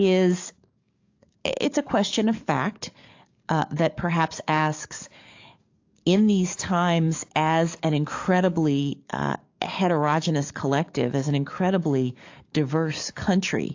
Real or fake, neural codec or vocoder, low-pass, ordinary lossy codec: fake; codec, 44.1 kHz, 7.8 kbps, DAC; 7.2 kHz; AAC, 48 kbps